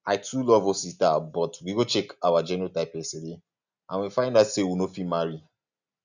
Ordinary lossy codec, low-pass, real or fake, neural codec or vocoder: none; 7.2 kHz; real; none